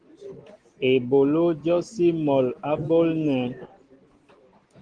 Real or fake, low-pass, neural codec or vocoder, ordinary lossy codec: real; 9.9 kHz; none; Opus, 16 kbps